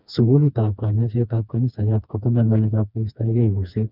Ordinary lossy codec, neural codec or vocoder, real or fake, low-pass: none; codec, 16 kHz, 2 kbps, FreqCodec, smaller model; fake; 5.4 kHz